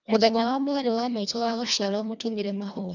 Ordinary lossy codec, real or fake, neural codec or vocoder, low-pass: none; fake; codec, 24 kHz, 1.5 kbps, HILCodec; 7.2 kHz